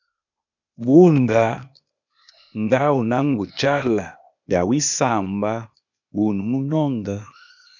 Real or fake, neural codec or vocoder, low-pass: fake; codec, 16 kHz, 0.8 kbps, ZipCodec; 7.2 kHz